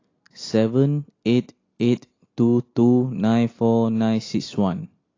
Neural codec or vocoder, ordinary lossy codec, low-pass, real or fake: none; AAC, 32 kbps; 7.2 kHz; real